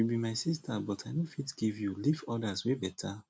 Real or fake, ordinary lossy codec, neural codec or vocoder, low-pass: real; none; none; none